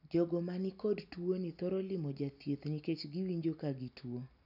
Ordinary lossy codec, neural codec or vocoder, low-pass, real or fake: none; none; 5.4 kHz; real